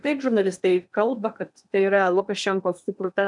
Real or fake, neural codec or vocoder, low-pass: fake; codec, 16 kHz in and 24 kHz out, 0.8 kbps, FocalCodec, streaming, 65536 codes; 10.8 kHz